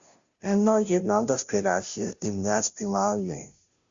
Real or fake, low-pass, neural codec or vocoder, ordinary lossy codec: fake; 7.2 kHz; codec, 16 kHz, 0.5 kbps, FunCodec, trained on Chinese and English, 25 frames a second; Opus, 64 kbps